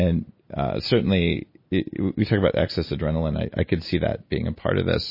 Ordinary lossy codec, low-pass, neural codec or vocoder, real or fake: MP3, 24 kbps; 5.4 kHz; none; real